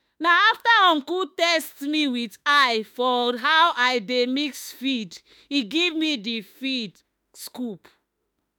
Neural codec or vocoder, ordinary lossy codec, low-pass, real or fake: autoencoder, 48 kHz, 32 numbers a frame, DAC-VAE, trained on Japanese speech; none; none; fake